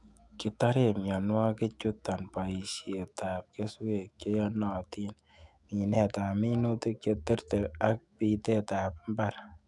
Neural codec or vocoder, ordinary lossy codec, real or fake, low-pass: autoencoder, 48 kHz, 128 numbers a frame, DAC-VAE, trained on Japanese speech; none; fake; 10.8 kHz